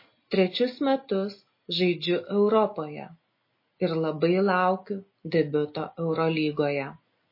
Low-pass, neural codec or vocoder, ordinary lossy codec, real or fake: 5.4 kHz; none; MP3, 24 kbps; real